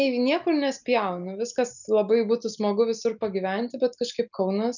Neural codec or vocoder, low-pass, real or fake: none; 7.2 kHz; real